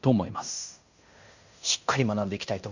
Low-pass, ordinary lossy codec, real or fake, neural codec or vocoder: 7.2 kHz; none; fake; codec, 16 kHz in and 24 kHz out, 0.9 kbps, LongCat-Audio-Codec, fine tuned four codebook decoder